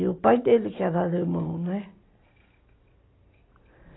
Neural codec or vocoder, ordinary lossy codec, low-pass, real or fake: none; AAC, 16 kbps; 7.2 kHz; real